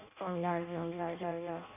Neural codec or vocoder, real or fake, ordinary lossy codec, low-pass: codec, 16 kHz in and 24 kHz out, 0.6 kbps, FireRedTTS-2 codec; fake; MP3, 24 kbps; 3.6 kHz